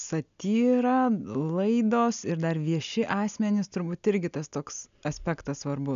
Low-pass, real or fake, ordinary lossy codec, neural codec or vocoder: 7.2 kHz; real; AAC, 96 kbps; none